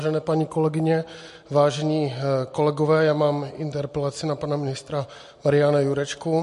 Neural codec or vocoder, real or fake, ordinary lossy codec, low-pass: none; real; MP3, 48 kbps; 14.4 kHz